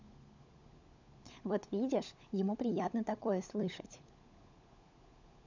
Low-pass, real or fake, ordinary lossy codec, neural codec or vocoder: 7.2 kHz; fake; none; codec, 16 kHz, 16 kbps, FunCodec, trained on LibriTTS, 50 frames a second